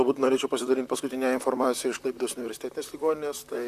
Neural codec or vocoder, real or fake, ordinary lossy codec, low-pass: vocoder, 44.1 kHz, 128 mel bands, Pupu-Vocoder; fake; AAC, 96 kbps; 14.4 kHz